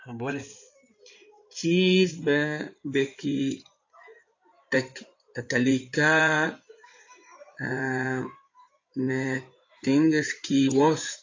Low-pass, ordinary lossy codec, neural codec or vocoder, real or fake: 7.2 kHz; AAC, 48 kbps; codec, 16 kHz in and 24 kHz out, 2.2 kbps, FireRedTTS-2 codec; fake